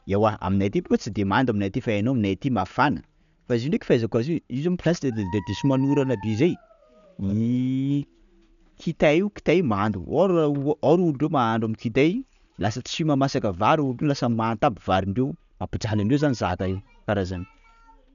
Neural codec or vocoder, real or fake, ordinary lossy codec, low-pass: none; real; none; 7.2 kHz